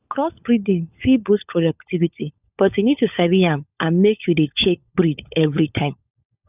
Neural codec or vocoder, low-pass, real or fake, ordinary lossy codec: codec, 16 kHz, 16 kbps, FunCodec, trained on LibriTTS, 50 frames a second; 3.6 kHz; fake; none